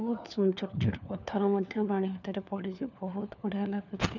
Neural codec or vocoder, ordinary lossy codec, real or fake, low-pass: codec, 16 kHz, 4 kbps, FunCodec, trained on LibriTTS, 50 frames a second; none; fake; 7.2 kHz